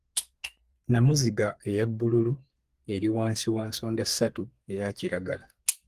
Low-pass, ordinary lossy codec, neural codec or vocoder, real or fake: 14.4 kHz; Opus, 24 kbps; codec, 32 kHz, 1.9 kbps, SNAC; fake